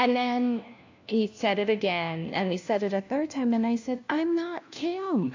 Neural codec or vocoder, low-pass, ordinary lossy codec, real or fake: codec, 16 kHz, 1 kbps, FunCodec, trained on LibriTTS, 50 frames a second; 7.2 kHz; AAC, 48 kbps; fake